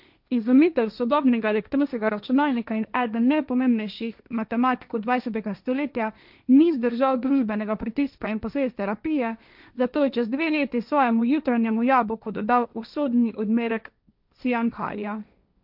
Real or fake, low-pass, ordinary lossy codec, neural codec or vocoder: fake; 5.4 kHz; none; codec, 16 kHz, 1.1 kbps, Voila-Tokenizer